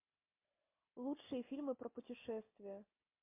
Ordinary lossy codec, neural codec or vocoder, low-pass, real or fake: MP3, 24 kbps; none; 3.6 kHz; real